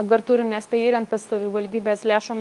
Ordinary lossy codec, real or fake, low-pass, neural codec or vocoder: Opus, 32 kbps; fake; 10.8 kHz; codec, 24 kHz, 0.9 kbps, WavTokenizer, medium speech release version 2